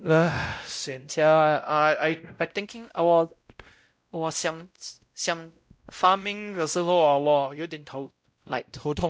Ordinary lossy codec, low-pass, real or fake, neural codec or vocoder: none; none; fake; codec, 16 kHz, 0.5 kbps, X-Codec, WavLM features, trained on Multilingual LibriSpeech